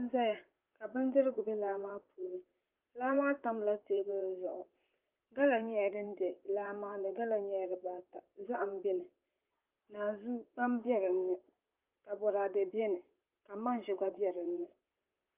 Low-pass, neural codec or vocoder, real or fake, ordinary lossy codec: 3.6 kHz; vocoder, 44.1 kHz, 128 mel bands, Pupu-Vocoder; fake; Opus, 32 kbps